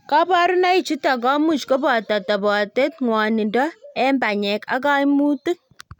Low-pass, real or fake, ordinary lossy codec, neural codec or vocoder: 19.8 kHz; real; none; none